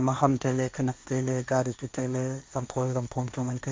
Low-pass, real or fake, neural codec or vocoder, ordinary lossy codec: none; fake; codec, 16 kHz, 1.1 kbps, Voila-Tokenizer; none